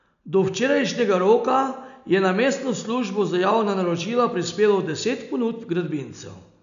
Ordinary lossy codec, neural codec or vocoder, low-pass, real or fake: none; none; 7.2 kHz; real